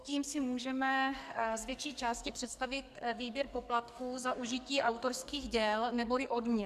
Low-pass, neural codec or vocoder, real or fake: 14.4 kHz; codec, 44.1 kHz, 2.6 kbps, SNAC; fake